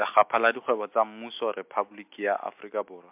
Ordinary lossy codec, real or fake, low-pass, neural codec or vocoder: MP3, 32 kbps; real; 3.6 kHz; none